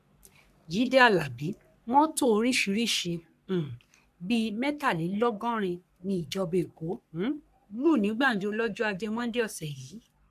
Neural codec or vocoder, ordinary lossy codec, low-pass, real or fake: codec, 44.1 kHz, 3.4 kbps, Pupu-Codec; none; 14.4 kHz; fake